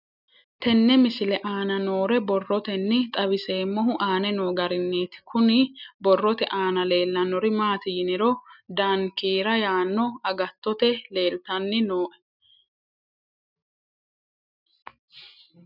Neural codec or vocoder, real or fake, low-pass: none; real; 5.4 kHz